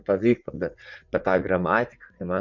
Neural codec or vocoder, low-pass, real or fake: vocoder, 44.1 kHz, 80 mel bands, Vocos; 7.2 kHz; fake